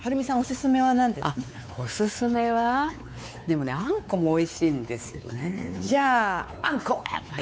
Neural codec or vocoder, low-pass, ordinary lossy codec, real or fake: codec, 16 kHz, 4 kbps, X-Codec, WavLM features, trained on Multilingual LibriSpeech; none; none; fake